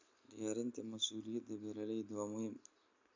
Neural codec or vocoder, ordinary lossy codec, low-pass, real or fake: none; MP3, 64 kbps; 7.2 kHz; real